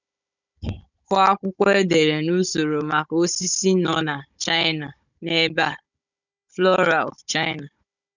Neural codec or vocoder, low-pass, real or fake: codec, 16 kHz, 16 kbps, FunCodec, trained on Chinese and English, 50 frames a second; 7.2 kHz; fake